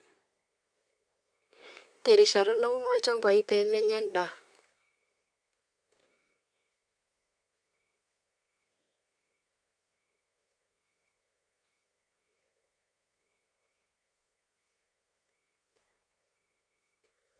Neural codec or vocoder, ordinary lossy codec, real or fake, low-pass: codec, 24 kHz, 1 kbps, SNAC; none; fake; 9.9 kHz